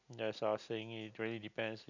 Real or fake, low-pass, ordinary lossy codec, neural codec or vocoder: real; 7.2 kHz; none; none